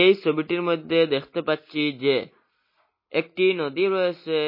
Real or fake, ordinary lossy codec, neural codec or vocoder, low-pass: real; MP3, 24 kbps; none; 5.4 kHz